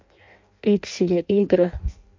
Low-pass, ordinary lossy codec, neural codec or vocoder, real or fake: 7.2 kHz; MP3, 48 kbps; codec, 16 kHz in and 24 kHz out, 0.6 kbps, FireRedTTS-2 codec; fake